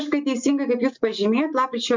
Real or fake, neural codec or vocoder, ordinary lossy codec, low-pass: real; none; MP3, 64 kbps; 7.2 kHz